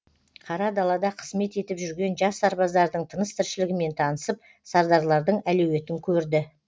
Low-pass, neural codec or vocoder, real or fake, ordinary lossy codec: none; none; real; none